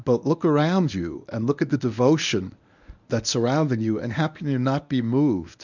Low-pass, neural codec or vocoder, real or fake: 7.2 kHz; codec, 24 kHz, 0.9 kbps, WavTokenizer, medium speech release version 1; fake